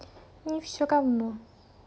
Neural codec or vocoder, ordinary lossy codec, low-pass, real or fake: none; none; none; real